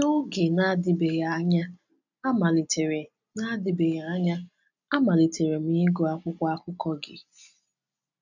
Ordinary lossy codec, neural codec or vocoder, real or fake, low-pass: none; none; real; 7.2 kHz